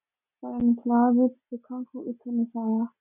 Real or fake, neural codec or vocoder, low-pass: real; none; 3.6 kHz